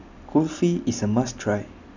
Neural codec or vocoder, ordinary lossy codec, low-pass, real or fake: none; none; 7.2 kHz; real